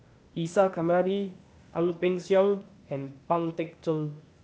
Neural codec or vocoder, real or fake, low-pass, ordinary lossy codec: codec, 16 kHz, 0.8 kbps, ZipCodec; fake; none; none